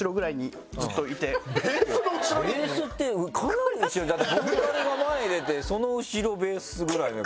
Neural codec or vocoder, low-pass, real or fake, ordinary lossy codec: none; none; real; none